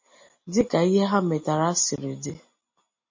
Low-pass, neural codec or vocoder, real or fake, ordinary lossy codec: 7.2 kHz; none; real; MP3, 32 kbps